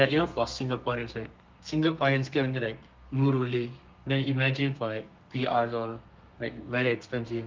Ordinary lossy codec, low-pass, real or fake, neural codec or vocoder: Opus, 32 kbps; 7.2 kHz; fake; codec, 32 kHz, 1.9 kbps, SNAC